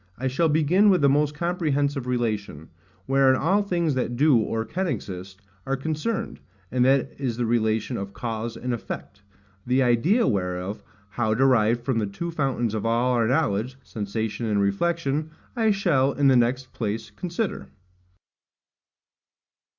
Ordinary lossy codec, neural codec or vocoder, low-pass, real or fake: Opus, 64 kbps; none; 7.2 kHz; real